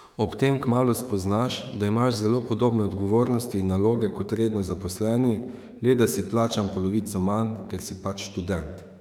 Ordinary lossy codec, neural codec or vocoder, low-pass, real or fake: none; autoencoder, 48 kHz, 32 numbers a frame, DAC-VAE, trained on Japanese speech; 19.8 kHz; fake